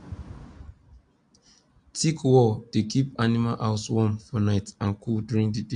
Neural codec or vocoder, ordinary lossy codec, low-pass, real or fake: none; AAC, 64 kbps; 9.9 kHz; real